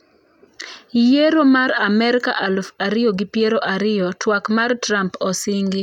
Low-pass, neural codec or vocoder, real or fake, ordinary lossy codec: 19.8 kHz; none; real; none